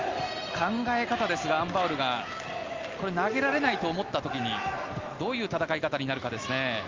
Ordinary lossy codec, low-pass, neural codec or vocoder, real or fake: Opus, 32 kbps; 7.2 kHz; none; real